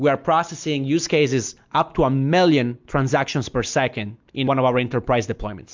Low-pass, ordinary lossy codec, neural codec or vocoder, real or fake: 7.2 kHz; MP3, 64 kbps; none; real